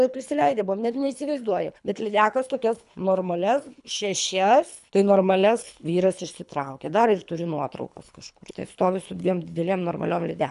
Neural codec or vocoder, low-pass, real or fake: codec, 24 kHz, 3 kbps, HILCodec; 10.8 kHz; fake